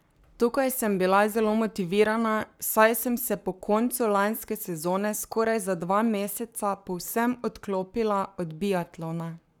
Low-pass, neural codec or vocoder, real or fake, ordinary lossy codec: none; codec, 44.1 kHz, 7.8 kbps, Pupu-Codec; fake; none